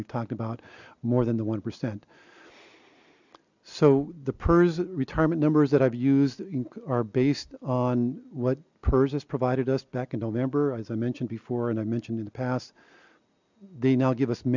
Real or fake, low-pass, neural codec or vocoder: real; 7.2 kHz; none